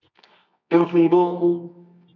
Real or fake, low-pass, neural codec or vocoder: fake; 7.2 kHz; codec, 24 kHz, 0.9 kbps, WavTokenizer, medium music audio release